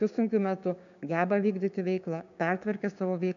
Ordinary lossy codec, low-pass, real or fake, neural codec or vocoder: MP3, 96 kbps; 7.2 kHz; fake; codec, 16 kHz, 6 kbps, DAC